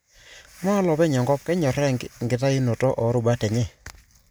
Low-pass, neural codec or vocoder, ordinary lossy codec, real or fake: none; none; none; real